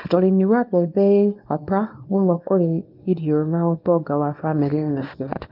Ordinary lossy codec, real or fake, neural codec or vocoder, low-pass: Opus, 24 kbps; fake; codec, 24 kHz, 0.9 kbps, WavTokenizer, small release; 5.4 kHz